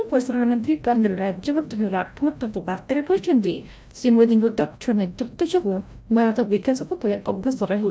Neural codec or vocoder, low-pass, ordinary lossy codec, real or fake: codec, 16 kHz, 0.5 kbps, FreqCodec, larger model; none; none; fake